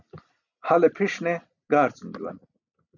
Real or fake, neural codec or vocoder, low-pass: real; none; 7.2 kHz